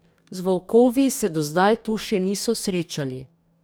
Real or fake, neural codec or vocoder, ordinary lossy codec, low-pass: fake; codec, 44.1 kHz, 2.6 kbps, DAC; none; none